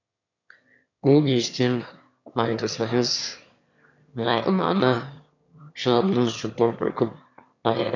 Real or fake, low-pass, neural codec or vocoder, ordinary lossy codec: fake; 7.2 kHz; autoencoder, 22.05 kHz, a latent of 192 numbers a frame, VITS, trained on one speaker; none